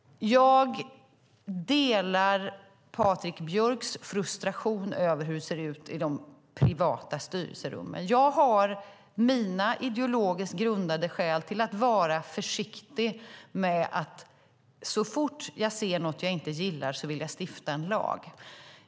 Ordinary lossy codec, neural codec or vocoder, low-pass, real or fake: none; none; none; real